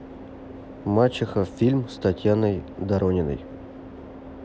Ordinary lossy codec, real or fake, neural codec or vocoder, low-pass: none; real; none; none